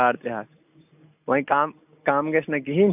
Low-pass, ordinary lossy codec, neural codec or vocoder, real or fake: 3.6 kHz; none; none; real